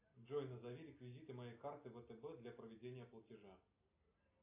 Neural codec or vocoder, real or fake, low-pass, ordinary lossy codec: none; real; 3.6 kHz; AAC, 32 kbps